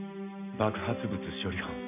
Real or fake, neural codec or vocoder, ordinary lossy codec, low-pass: real; none; none; 3.6 kHz